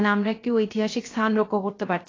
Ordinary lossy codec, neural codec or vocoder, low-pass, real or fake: AAC, 32 kbps; codec, 16 kHz, 0.3 kbps, FocalCodec; 7.2 kHz; fake